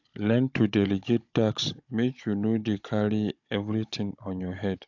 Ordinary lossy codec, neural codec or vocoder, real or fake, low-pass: AAC, 48 kbps; codec, 16 kHz, 16 kbps, FunCodec, trained on Chinese and English, 50 frames a second; fake; 7.2 kHz